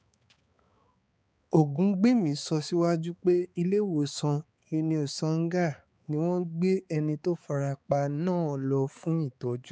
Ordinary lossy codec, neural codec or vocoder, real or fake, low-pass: none; codec, 16 kHz, 4 kbps, X-Codec, HuBERT features, trained on balanced general audio; fake; none